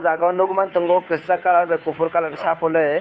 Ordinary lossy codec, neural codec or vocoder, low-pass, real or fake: none; codec, 16 kHz, 2 kbps, FunCodec, trained on Chinese and English, 25 frames a second; none; fake